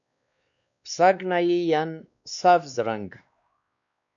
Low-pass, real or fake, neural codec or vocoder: 7.2 kHz; fake; codec, 16 kHz, 2 kbps, X-Codec, WavLM features, trained on Multilingual LibriSpeech